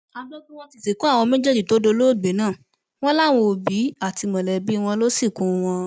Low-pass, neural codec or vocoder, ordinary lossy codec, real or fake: none; none; none; real